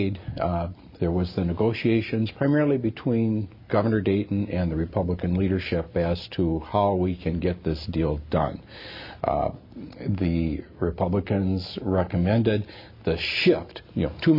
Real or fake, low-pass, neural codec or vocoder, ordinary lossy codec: real; 5.4 kHz; none; MP3, 32 kbps